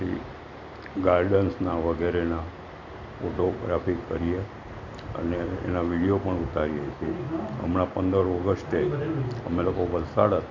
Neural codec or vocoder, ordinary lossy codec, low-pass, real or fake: none; MP3, 48 kbps; 7.2 kHz; real